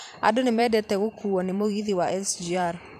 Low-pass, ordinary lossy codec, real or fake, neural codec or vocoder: 14.4 kHz; none; fake; vocoder, 44.1 kHz, 128 mel bands every 512 samples, BigVGAN v2